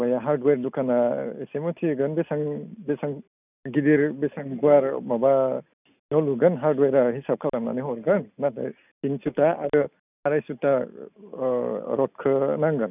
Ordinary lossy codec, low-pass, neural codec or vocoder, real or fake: none; 3.6 kHz; none; real